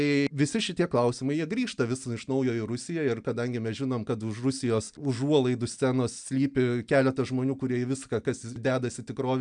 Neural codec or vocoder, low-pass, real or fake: none; 9.9 kHz; real